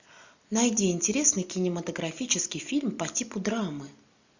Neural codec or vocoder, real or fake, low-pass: none; real; 7.2 kHz